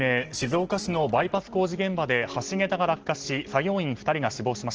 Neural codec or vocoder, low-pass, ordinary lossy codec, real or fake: none; 7.2 kHz; Opus, 16 kbps; real